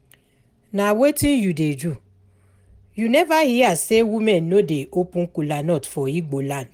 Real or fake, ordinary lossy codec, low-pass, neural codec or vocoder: real; Opus, 24 kbps; 19.8 kHz; none